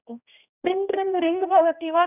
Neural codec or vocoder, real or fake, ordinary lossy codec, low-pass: codec, 16 kHz, 1 kbps, X-Codec, HuBERT features, trained on general audio; fake; none; 3.6 kHz